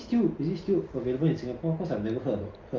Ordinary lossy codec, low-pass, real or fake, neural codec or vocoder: Opus, 24 kbps; 7.2 kHz; real; none